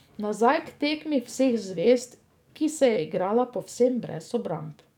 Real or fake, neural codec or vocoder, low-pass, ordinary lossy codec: fake; codec, 44.1 kHz, 7.8 kbps, DAC; 19.8 kHz; none